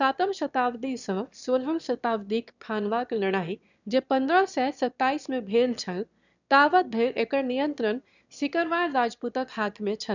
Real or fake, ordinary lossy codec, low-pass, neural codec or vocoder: fake; none; 7.2 kHz; autoencoder, 22.05 kHz, a latent of 192 numbers a frame, VITS, trained on one speaker